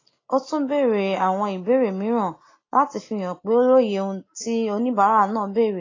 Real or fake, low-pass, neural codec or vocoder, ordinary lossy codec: real; 7.2 kHz; none; AAC, 32 kbps